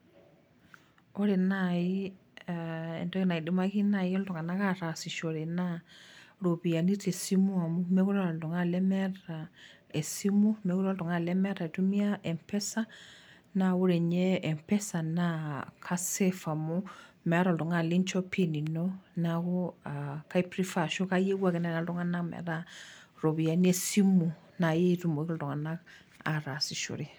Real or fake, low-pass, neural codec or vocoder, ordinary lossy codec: real; none; none; none